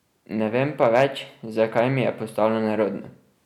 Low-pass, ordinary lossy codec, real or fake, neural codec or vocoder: 19.8 kHz; none; real; none